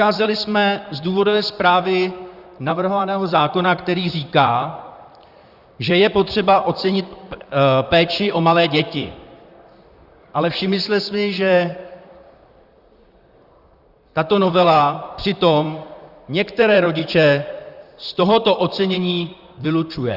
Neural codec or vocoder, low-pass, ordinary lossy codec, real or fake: vocoder, 44.1 kHz, 128 mel bands, Pupu-Vocoder; 5.4 kHz; Opus, 64 kbps; fake